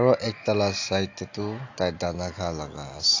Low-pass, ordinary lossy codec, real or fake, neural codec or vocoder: 7.2 kHz; AAC, 48 kbps; real; none